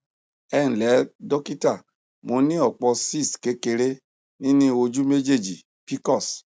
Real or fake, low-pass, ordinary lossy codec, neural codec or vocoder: real; none; none; none